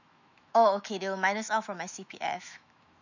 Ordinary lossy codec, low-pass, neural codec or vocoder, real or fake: none; 7.2 kHz; autoencoder, 48 kHz, 128 numbers a frame, DAC-VAE, trained on Japanese speech; fake